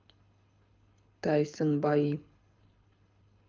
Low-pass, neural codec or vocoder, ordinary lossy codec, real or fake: 7.2 kHz; codec, 24 kHz, 6 kbps, HILCodec; Opus, 24 kbps; fake